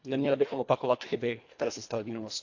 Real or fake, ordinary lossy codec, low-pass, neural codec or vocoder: fake; AAC, 48 kbps; 7.2 kHz; codec, 24 kHz, 1.5 kbps, HILCodec